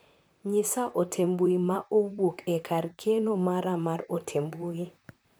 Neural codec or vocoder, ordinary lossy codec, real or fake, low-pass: vocoder, 44.1 kHz, 128 mel bands, Pupu-Vocoder; none; fake; none